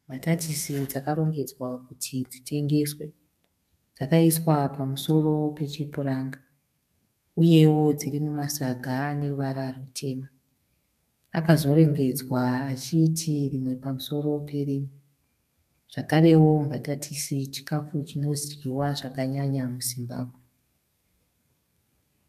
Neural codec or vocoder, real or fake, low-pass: codec, 32 kHz, 1.9 kbps, SNAC; fake; 14.4 kHz